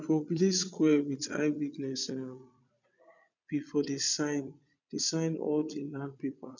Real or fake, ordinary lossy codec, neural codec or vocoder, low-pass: fake; none; vocoder, 22.05 kHz, 80 mel bands, Vocos; 7.2 kHz